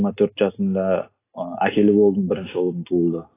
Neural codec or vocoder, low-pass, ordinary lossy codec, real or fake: none; 3.6 kHz; AAC, 16 kbps; real